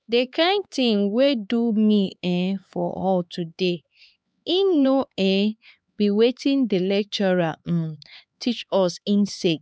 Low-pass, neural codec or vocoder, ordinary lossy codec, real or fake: none; codec, 16 kHz, 4 kbps, X-Codec, HuBERT features, trained on LibriSpeech; none; fake